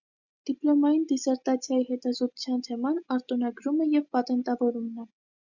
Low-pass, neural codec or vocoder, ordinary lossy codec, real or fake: 7.2 kHz; none; Opus, 64 kbps; real